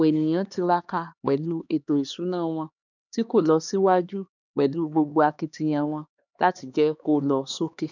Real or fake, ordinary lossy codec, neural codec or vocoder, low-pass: fake; none; codec, 16 kHz, 2 kbps, X-Codec, HuBERT features, trained on LibriSpeech; 7.2 kHz